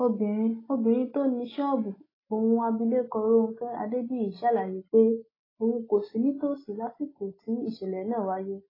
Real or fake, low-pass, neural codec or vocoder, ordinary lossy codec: real; 5.4 kHz; none; AAC, 24 kbps